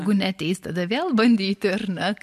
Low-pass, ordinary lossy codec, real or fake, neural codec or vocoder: 14.4 kHz; MP3, 64 kbps; real; none